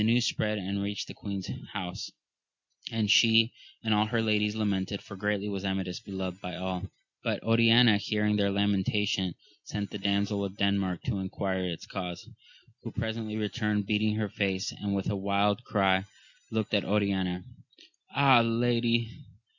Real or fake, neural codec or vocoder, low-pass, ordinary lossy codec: real; none; 7.2 kHz; MP3, 64 kbps